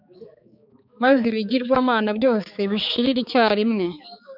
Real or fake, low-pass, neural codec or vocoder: fake; 5.4 kHz; codec, 16 kHz, 4 kbps, X-Codec, HuBERT features, trained on balanced general audio